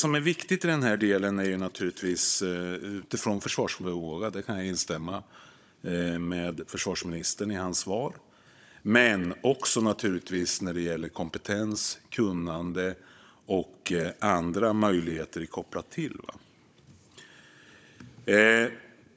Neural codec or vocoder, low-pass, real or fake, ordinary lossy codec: codec, 16 kHz, 16 kbps, FunCodec, trained on Chinese and English, 50 frames a second; none; fake; none